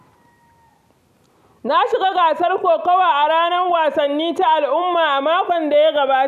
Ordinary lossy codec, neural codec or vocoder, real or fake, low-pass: none; none; real; 14.4 kHz